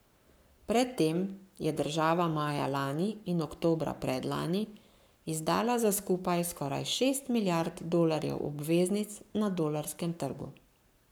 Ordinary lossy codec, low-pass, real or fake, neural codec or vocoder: none; none; fake; codec, 44.1 kHz, 7.8 kbps, Pupu-Codec